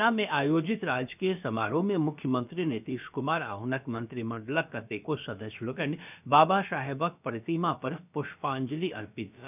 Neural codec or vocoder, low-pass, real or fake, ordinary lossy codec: codec, 16 kHz, about 1 kbps, DyCAST, with the encoder's durations; 3.6 kHz; fake; none